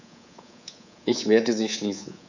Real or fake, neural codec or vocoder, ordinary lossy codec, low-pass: fake; codec, 24 kHz, 3.1 kbps, DualCodec; none; 7.2 kHz